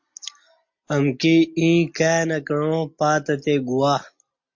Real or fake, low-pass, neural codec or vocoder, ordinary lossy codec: real; 7.2 kHz; none; MP3, 48 kbps